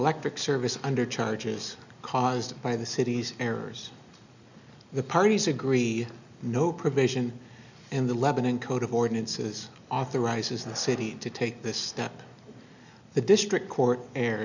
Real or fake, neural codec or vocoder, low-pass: real; none; 7.2 kHz